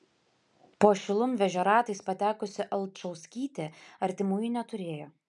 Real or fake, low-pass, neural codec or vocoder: real; 10.8 kHz; none